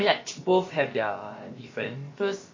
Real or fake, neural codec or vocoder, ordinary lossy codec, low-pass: fake; codec, 16 kHz, about 1 kbps, DyCAST, with the encoder's durations; MP3, 32 kbps; 7.2 kHz